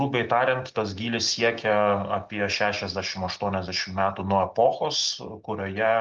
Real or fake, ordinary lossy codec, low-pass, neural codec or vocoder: real; Opus, 24 kbps; 7.2 kHz; none